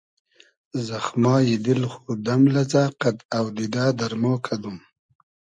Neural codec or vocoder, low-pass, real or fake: none; 9.9 kHz; real